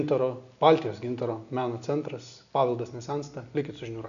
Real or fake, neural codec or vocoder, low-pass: real; none; 7.2 kHz